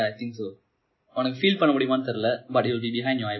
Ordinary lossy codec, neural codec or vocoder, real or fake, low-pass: MP3, 24 kbps; none; real; 7.2 kHz